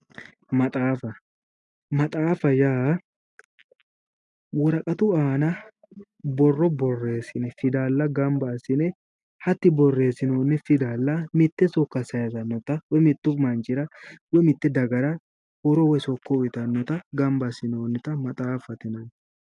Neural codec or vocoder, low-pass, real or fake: none; 10.8 kHz; real